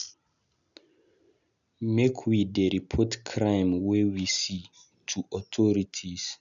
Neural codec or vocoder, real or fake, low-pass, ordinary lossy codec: none; real; 7.2 kHz; none